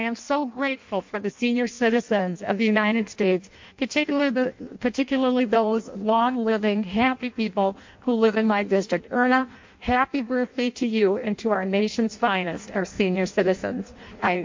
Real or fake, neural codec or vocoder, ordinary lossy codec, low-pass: fake; codec, 16 kHz in and 24 kHz out, 0.6 kbps, FireRedTTS-2 codec; MP3, 48 kbps; 7.2 kHz